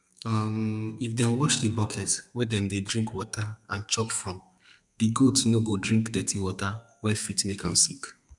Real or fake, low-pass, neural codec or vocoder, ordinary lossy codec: fake; 10.8 kHz; codec, 32 kHz, 1.9 kbps, SNAC; none